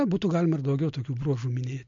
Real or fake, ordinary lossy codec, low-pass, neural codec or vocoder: real; MP3, 48 kbps; 7.2 kHz; none